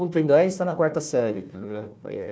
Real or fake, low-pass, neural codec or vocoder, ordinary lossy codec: fake; none; codec, 16 kHz, 1 kbps, FunCodec, trained on Chinese and English, 50 frames a second; none